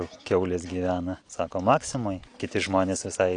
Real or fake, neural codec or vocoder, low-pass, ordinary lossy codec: real; none; 9.9 kHz; AAC, 48 kbps